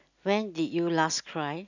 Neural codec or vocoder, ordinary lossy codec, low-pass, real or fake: none; none; 7.2 kHz; real